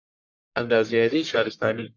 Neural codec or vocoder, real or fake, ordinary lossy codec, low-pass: codec, 44.1 kHz, 1.7 kbps, Pupu-Codec; fake; MP3, 48 kbps; 7.2 kHz